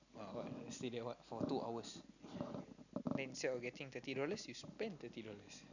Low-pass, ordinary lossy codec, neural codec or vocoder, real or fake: 7.2 kHz; none; none; real